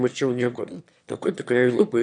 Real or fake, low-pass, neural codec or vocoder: fake; 9.9 kHz; autoencoder, 22.05 kHz, a latent of 192 numbers a frame, VITS, trained on one speaker